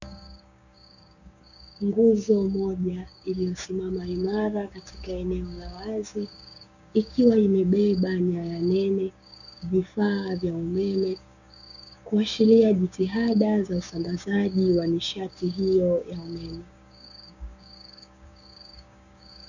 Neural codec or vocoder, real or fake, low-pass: codec, 16 kHz, 6 kbps, DAC; fake; 7.2 kHz